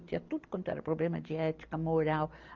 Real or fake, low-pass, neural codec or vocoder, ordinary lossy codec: real; 7.2 kHz; none; Opus, 32 kbps